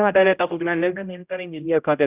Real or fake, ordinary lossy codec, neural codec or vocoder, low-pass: fake; Opus, 64 kbps; codec, 16 kHz, 0.5 kbps, X-Codec, HuBERT features, trained on general audio; 3.6 kHz